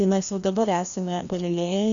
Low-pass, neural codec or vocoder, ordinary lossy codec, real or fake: 7.2 kHz; codec, 16 kHz, 1 kbps, FreqCodec, larger model; MP3, 96 kbps; fake